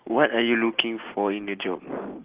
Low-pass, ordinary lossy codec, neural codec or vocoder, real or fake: 3.6 kHz; Opus, 16 kbps; none; real